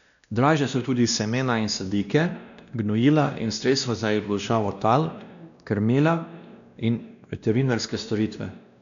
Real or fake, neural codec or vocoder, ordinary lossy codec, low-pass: fake; codec, 16 kHz, 1 kbps, X-Codec, WavLM features, trained on Multilingual LibriSpeech; none; 7.2 kHz